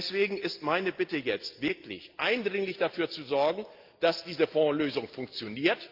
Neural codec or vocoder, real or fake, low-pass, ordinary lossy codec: none; real; 5.4 kHz; Opus, 24 kbps